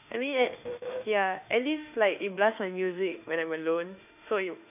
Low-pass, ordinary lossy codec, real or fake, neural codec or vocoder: 3.6 kHz; none; fake; autoencoder, 48 kHz, 32 numbers a frame, DAC-VAE, trained on Japanese speech